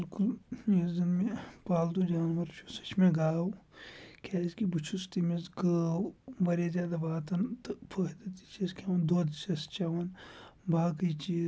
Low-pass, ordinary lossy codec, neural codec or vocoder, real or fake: none; none; none; real